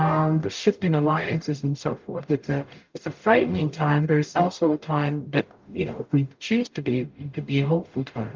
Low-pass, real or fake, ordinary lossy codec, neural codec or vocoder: 7.2 kHz; fake; Opus, 24 kbps; codec, 44.1 kHz, 0.9 kbps, DAC